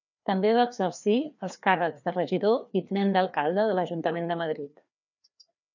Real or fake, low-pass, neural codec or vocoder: fake; 7.2 kHz; codec, 16 kHz, 2 kbps, FreqCodec, larger model